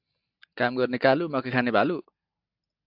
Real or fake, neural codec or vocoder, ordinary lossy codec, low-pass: real; none; AAC, 48 kbps; 5.4 kHz